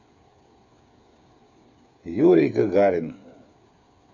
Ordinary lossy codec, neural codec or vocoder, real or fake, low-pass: none; codec, 16 kHz, 8 kbps, FreqCodec, smaller model; fake; 7.2 kHz